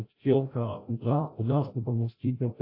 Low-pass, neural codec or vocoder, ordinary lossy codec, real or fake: 5.4 kHz; codec, 16 kHz, 0.5 kbps, FreqCodec, larger model; AAC, 32 kbps; fake